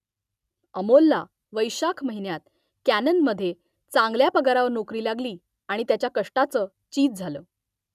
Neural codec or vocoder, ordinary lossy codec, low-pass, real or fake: none; none; 14.4 kHz; real